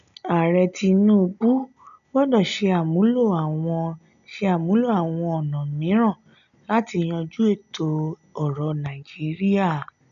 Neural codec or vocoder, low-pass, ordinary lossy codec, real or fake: none; 7.2 kHz; none; real